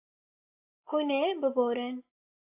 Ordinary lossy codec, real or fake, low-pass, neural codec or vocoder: AAC, 24 kbps; real; 3.6 kHz; none